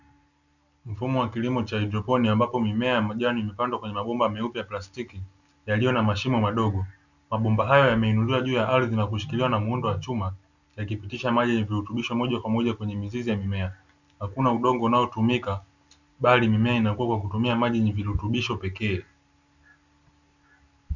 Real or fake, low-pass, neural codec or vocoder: real; 7.2 kHz; none